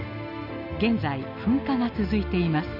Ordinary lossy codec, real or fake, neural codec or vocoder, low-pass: none; real; none; 5.4 kHz